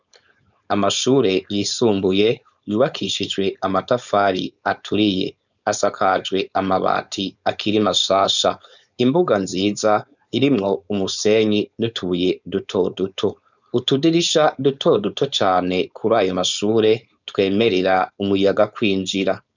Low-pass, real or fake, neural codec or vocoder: 7.2 kHz; fake; codec, 16 kHz, 4.8 kbps, FACodec